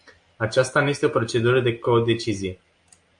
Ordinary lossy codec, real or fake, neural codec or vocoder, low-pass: MP3, 48 kbps; real; none; 9.9 kHz